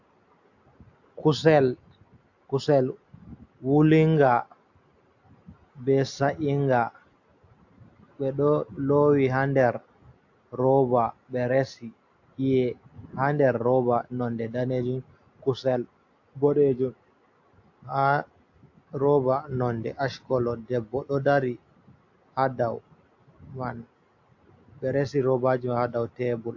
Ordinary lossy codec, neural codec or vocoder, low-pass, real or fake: AAC, 48 kbps; none; 7.2 kHz; real